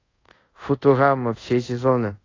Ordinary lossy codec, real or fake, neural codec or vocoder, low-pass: AAC, 32 kbps; fake; codec, 24 kHz, 0.5 kbps, DualCodec; 7.2 kHz